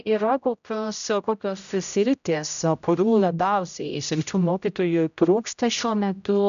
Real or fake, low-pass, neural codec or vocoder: fake; 7.2 kHz; codec, 16 kHz, 0.5 kbps, X-Codec, HuBERT features, trained on general audio